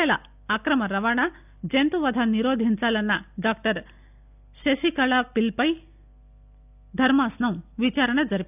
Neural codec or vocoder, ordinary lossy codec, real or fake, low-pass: none; none; real; 3.6 kHz